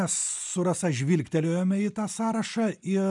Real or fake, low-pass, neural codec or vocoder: real; 10.8 kHz; none